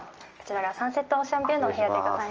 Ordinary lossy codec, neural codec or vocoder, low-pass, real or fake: Opus, 24 kbps; none; 7.2 kHz; real